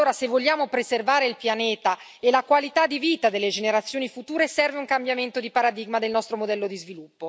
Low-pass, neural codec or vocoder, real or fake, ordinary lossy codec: none; none; real; none